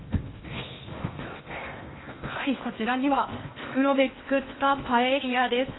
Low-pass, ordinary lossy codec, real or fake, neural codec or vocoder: 7.2 kHz; AAC, 16 kbps; fake; codec, 16 kHz in and 24 kHz out, 0.8 kbps, FocalCodec, streaming, 65536 codes